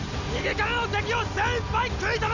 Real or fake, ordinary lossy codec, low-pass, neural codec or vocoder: real; none; 7.2 kHz; none